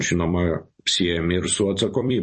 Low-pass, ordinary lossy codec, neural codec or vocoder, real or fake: 10.8 kHz; MP3, 32 kbps; vocoder, 44.1 kHz, 128 mel bands every 256 samples, BigVGAN v2; fake